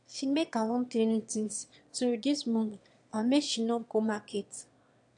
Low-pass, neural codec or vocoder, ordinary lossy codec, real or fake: 9.9 kHz; autoencoder, 22.05 kHz, a latent of 192 numbers a frame, VITS, trained on one speaker; AAC, 64 kbps; fake